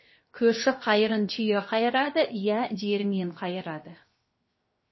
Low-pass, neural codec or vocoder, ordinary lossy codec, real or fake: 7.2 kHz; codec, 16 kHz, 0.7 kbps, FocalCodec; MP3, 24 kbps; fake